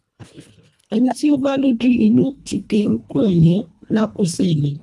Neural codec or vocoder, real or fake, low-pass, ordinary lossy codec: codec, 24 kHz, 1.5 kbps, HILCodec; fake; none; none